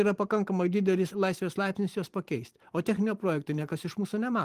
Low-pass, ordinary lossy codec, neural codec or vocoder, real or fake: 14.4 kHz; Opus, 24 kbps; vocoder, 44.1 kHz, 128 mel bands every 512 samples, BigVGAN v2; fake